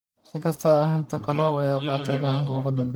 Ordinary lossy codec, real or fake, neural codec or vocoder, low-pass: none; fake; codec, 44.1 kHz, 1.7 kbps, Pupu-Codec; none